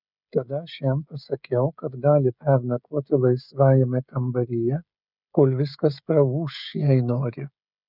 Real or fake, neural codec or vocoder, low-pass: fake; codec, 16 kHz, 16 kbps, FreqCodec, smaller model; 5.4 kHz